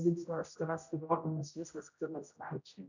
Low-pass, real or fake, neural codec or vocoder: 7.2 kHz; fake; codec, 16 kHz, 0.5 kbps, X-Codec, HuBERT features, trained on general audio